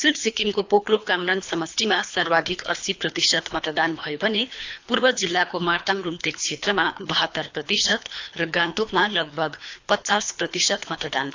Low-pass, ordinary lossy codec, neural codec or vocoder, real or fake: 7.2 kHz; AAC, 48 kbps; codec, 24 kHz, 3 kbps, HILCodec; fake